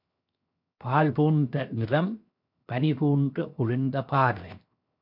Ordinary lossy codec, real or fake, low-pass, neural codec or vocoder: MP3, 48 kbps; fake; 5.4 kHz; codec, 16 kHz, 0.7 kbps, FocalCodec